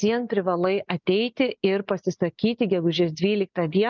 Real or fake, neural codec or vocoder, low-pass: real; none; 7.2 kHz